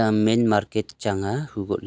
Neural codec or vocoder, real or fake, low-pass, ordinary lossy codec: none; real; none; none